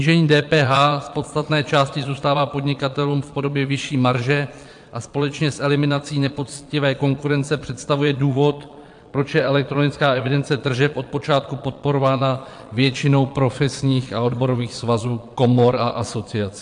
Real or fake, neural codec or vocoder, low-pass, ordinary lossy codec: fake; vocoder, 22.05 kHz, 80 mel bands, Vocos; 9.9 kHz; AAC, 64 kbps